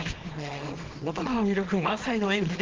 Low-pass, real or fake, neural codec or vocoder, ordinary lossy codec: 7.2 kHz; fake; codec, 24 kHz, 0.9 kbps, WavTokenizer, small release; Opus, 16 kbps